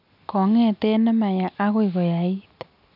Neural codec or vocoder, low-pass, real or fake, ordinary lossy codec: none; 5.4 kHz; real; none